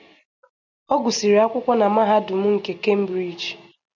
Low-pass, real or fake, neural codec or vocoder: 7.2 kHz; real; none